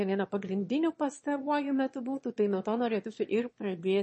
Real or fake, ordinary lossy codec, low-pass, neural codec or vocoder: fake; MP3, 32 kbps; 9.9 kHz; autoencoder, 22.05 kHz, a latent of 192 numbers a frame, VITS, trained on one speaker